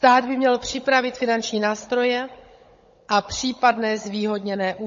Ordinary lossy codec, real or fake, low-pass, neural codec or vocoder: MP3, 32 kbps; fake; 7.2 kHz; codec, 16 kHz, 16 kbps, FunCodec, trained on Chinese and English, 50 frames a second